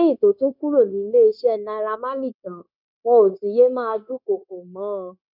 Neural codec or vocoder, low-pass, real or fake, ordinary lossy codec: codec, 16 kHz, 0.9 kbps, LongCat-Audio-Codec; 5.4 kHz; fake; none